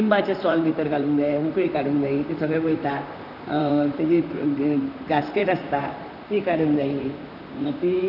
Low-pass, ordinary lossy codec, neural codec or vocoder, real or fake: 5.4 kHz; none; vocoder, 44.1 kHz, 128 mel bands, Pupu-Vocoder; fake